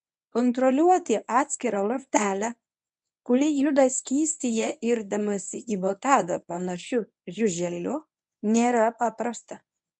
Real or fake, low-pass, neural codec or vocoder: fake; 10.8 kHz; codec, 24 kHz, 0.9 kbps, WavTokenizer, medium speech release version 1